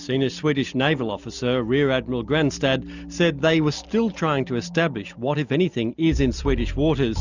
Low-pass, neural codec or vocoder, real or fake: 7.2 kHz; none; real